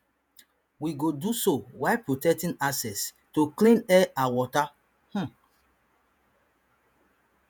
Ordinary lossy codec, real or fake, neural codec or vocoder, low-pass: none; fake; vocoder, 48 kHz, 128 mel bands, Vocos; none